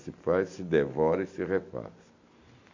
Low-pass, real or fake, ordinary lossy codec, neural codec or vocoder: 7.2 kHz; real; MP3, 64 kbps; none